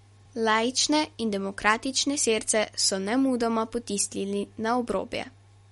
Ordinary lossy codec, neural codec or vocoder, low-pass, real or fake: MP3, 48 kbps; none; 19.8 kHz; real